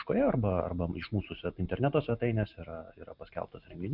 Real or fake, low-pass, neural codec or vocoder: real; 5.4 kHz; none